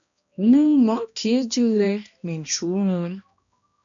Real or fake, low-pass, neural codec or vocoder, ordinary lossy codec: fake; 7.2 kHz; codec, 16 kHz, 1 kbps, X-Codec, HuBERT features, trained on balanced general audio; MP3, 96 kbps